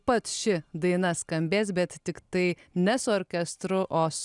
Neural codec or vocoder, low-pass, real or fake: none; 10.8 kHz; real